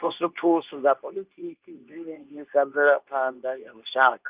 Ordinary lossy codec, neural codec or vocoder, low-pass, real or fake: Opus, 32 kbps; codec, 24 kHz, 0.9 kbps, WavTokenizer, medium speech release version 2; 3.6 kHz; fake